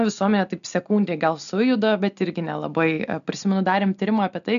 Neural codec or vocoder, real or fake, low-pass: none; real; 7.2 kHz